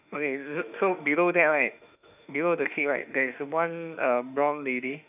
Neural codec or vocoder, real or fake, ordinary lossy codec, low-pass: autoencoder, 48 kHz, 32 numbers a frame, DAC-VAE, trained on Japanese speech; fake; none; 3.6 kHz